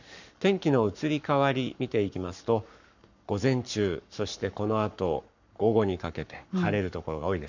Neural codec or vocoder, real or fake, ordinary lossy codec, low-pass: codec, 44.1 kHz, 7.8 kbps, Pupu-Codec; fake; none; 7.2 kHz